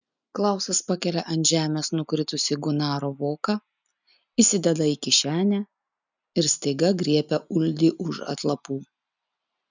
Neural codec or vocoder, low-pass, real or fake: none; 7.2 kHz; real